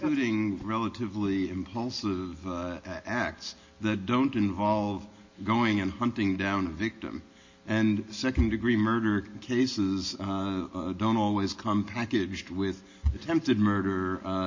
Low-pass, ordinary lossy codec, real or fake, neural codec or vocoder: 7.2 kHz; MP3, 32 kbps; real; none